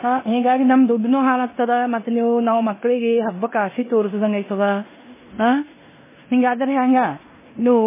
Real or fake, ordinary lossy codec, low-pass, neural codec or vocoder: fake; MP3, 16 kbps; 3.6 kHz; codec, 16 kHz in and 24 kHz out, 0.9 kbps, LongCat-Audio-Codec, four codebook decoder